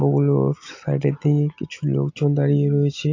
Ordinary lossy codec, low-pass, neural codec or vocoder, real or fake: AAC, 48 kbps; 7.2 kHz; none; real